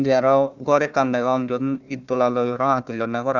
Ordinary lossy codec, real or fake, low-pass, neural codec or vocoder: none; fake; 7.2 kHz; codec, 16 kHz, 1 kbps, FunCodec, trained on Chinese and English, 50 frames a second